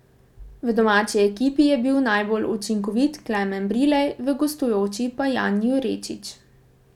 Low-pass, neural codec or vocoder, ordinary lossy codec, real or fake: 19.8 kHz; none; none; real